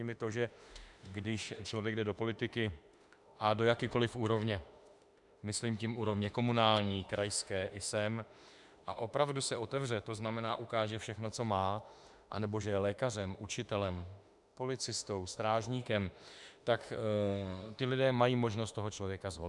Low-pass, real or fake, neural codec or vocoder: 10.8 kHz; fake; autoencoder, 48 kHz, 32 numbers a frame, DAC-VAE, trained on Japanese speech